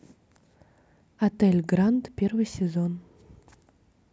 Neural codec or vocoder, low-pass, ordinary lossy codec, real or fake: none; none; none; real